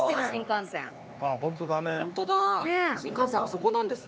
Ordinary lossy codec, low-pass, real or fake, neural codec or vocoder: none; none; fake; codec, 16 kHz, 4 kbps, X-Codec, HuBERT features, trained on LibriSpeech